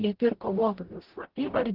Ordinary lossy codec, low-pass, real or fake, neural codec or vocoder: Opus, 16 kbps; 5.4 kHz; fake; codec, 44.1 kHz, 0.9 kbps, DAC